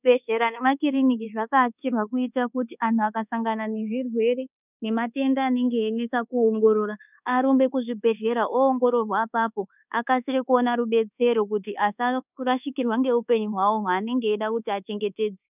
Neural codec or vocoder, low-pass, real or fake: codec, 24 kHz, 1.2 kbps, DualCodec; 3.6 kHz; fake